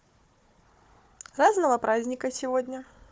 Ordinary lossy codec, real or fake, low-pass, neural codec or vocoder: none; fake; none; codec, 16 kHz, 4 kbps, FunCodec, trained on Chinese and English, 50 frames a second